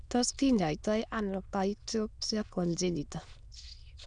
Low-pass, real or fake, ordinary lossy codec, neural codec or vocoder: 9.9 kHz; fake; none; autoencoder, 22.05 kHz, a latent of 192 numbers a frame, VITS, trained on many speakers